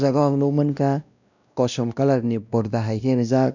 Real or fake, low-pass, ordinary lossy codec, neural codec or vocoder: fake; 7.2 kHz; none; codec, 16 kHz, 1 kbps, X-Codec, WavLM features, trained on Multilingual LibriSpeech